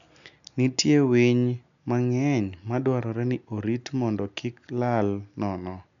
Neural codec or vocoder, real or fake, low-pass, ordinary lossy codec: none; real; 7.2 kHz; none